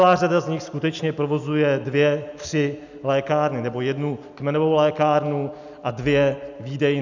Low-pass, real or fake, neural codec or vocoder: 7.2 kHz; real; none